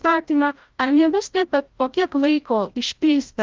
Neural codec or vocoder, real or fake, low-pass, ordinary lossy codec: codec, 16 kHz, 0.5 kbps, FreqCodec, larger model; fake; 7.2 kHz; Opus, 32 kbps